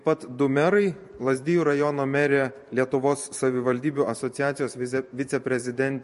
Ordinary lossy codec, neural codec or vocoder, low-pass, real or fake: MP3, 48 kbps; vocoder, 44.1 kHz, 128 mel bands every 512 samples, BigVGAN v2; 14.4 kHz; fake